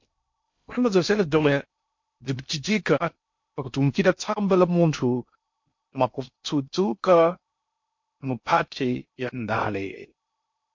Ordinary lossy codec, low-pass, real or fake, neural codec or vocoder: MP3, 48 kbps; 7.2 kHz; fake; codec, 16 kHz in and 24 kHz out, 0.6 kbps, FocalCodec, streaming, 2048 codes